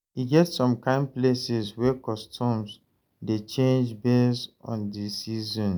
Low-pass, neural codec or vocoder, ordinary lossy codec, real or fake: none; none; none; real